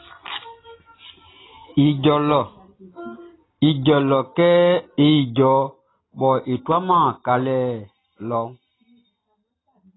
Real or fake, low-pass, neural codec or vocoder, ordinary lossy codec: real; 7.2 kHz; none; AAC, 16 kbps